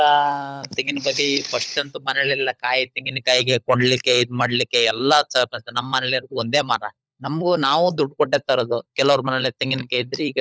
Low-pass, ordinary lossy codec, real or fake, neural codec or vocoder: none; none; fake; codec, 16 kHz, 16 kbps, FunCodec, trained on LibriTTS, 50 frames a second